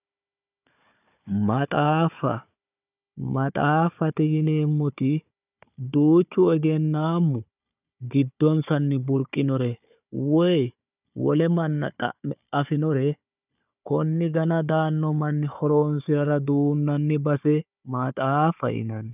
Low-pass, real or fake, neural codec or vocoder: 3.6 kHz; fake; codec, 16 kHz, 4 kbps, FunCodec, trained on Chinese and English, 50 frames a second